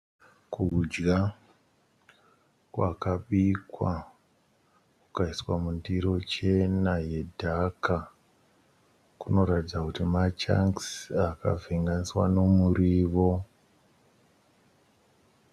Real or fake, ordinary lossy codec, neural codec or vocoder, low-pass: real; Opus, 64 kbps; none; 14.4 kHz